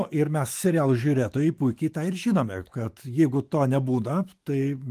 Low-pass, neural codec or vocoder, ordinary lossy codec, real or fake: 14.4 kHz; autoencoder, 48 kHz, 128 numbers a frame, DAC-VAE, trained on Japanese speech; Opus, 24 kbps; fake